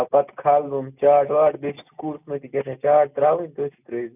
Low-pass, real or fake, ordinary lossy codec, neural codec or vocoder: 3.6 kHz; real; none; none